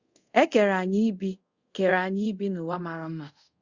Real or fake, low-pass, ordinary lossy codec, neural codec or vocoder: fake; 7.2 kHz; Opus, 64 kbps; codec, 24 kHz, 0.5 kbps, DualCodec